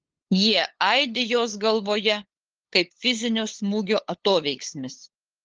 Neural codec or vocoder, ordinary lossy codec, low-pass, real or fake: codec, 16 kHz, 8 kbps, FunCodec, trained on LibriTTS, 25 frames a second; Opus, 16 kbps; 7.2 kHz; fake